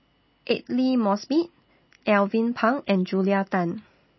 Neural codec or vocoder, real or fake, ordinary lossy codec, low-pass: none; real; MP3, 24 kbps; 7.2 kHz